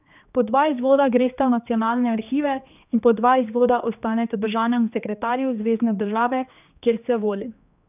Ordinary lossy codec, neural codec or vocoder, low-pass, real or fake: none; codec, 16 kHz, 2 kbps, X-Codec, HuBERT features, trained on general audio; 3.6 kHz; fake